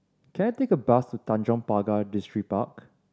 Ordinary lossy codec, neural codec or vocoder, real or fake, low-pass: none; none; real; none